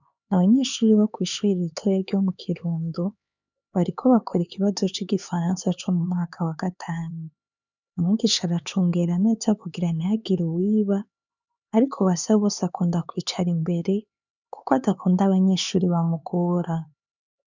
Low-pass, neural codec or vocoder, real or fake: 7.2 kHz; codec, 16 kHz, 4 kbps, X-Codec, HuBERT features, trained on LibriSpeech; fake